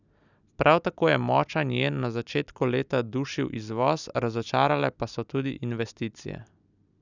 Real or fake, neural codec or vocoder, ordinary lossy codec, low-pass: real; none; none; 7.2 kHz